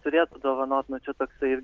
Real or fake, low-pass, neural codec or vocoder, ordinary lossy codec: real; 7.2 kHz; none; Opus, 16 kbps